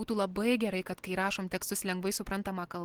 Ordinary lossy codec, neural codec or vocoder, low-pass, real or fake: Opus, 16 kbps; none; 19.8 kHz; real